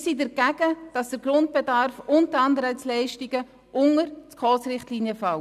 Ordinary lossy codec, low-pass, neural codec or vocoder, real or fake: none; 14.4 kHz; none; real